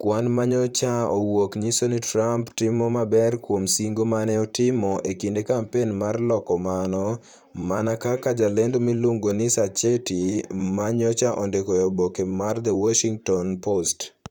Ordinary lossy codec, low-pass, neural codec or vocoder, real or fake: none; 19.8 kHz; vocoder, 44.1 kHz, 128 mel bands, Pupu-Vocoder; fake